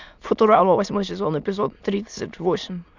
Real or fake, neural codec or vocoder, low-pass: fake; autoencoder, 22.05 kHz, a latent of 192 numbers a frame, VITS, trained on many speakers; 7.2 kHz